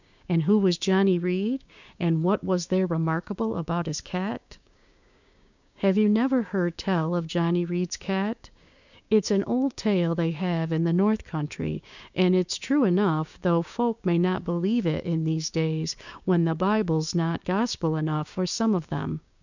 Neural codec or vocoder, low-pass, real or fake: codec, 16 kHz, 6 kbps, DAC; 7.2 kHz; fake